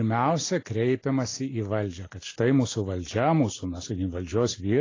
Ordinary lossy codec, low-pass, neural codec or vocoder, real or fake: AAC, 32 kbps; 7.2 kHz; none; real